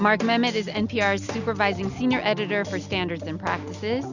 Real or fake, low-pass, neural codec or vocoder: real; 7.2 kHz; none